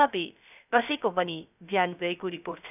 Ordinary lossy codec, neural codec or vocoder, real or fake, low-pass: none; codec, 16 kHz, 0.3 kbps, FocalCodec; fake; 3.6 kHz